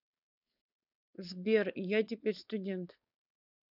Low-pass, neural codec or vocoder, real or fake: 5.4 kHz; codec, 16 kHz, 4.8 kbps, FACodec; fake